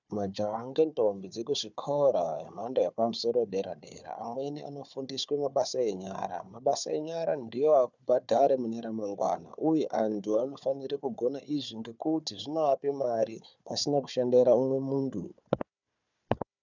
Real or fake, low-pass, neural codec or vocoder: fake; 7.2 kHz; codec, 16 kHz, 4 kbps, FunCodec, trained on Chinese and English, 50 frames a second